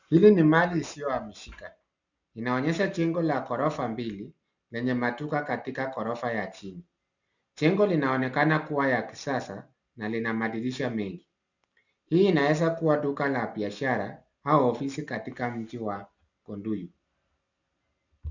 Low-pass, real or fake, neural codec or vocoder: 7.2 kHz; real; none